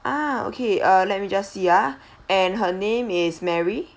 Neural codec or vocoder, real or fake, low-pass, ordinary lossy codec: none; real; none; none